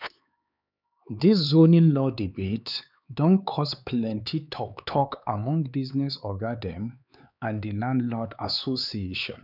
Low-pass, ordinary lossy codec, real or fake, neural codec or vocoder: 5.4 kHz; none; fake; codec, 16 kHz, 4 kbps, X-Codec, HuBERT features, trained on LibriSpeech